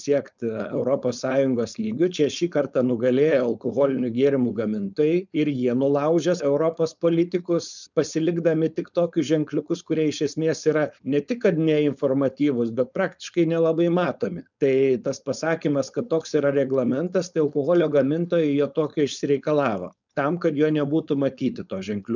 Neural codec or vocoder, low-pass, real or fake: codec, 16 kHz, 4.8 kbps, FACodec; 7.2 kHz; fake